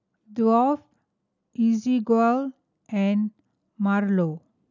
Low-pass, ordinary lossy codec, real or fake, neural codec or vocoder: 7.2 kHz; none; real; none